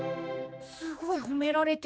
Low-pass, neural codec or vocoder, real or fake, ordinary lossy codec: none; codec, 16 kHz, 2 kbps, X-Codec, HuBERT features, trained on general audio; fake; none